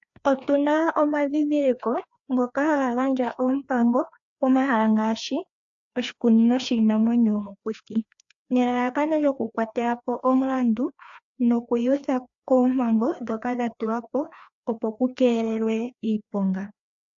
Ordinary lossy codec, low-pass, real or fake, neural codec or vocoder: AAC, 64 kbps; 7.2 kHz; fake; codec, 16 kHz, 2 kbps, FreqCodec, larger model